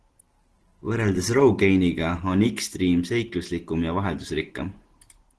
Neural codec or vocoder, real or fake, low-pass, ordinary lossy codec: none; real; 10.8 kHz; Opus, 16 kbps